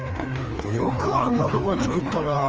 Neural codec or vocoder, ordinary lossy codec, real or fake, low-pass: codec, 16 kHz, 2 kbps, FreqCodec, larger model; Opus, 24 kbps; fake; 7.2 kHz